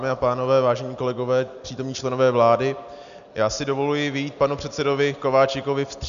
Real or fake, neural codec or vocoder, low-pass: real; none; 7.2 kHz